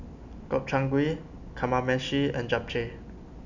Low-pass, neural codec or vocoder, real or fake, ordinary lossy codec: 7.2 kHz; none; real; none